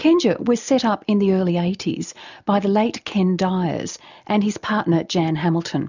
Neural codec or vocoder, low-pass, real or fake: none; 7.2 kHz; real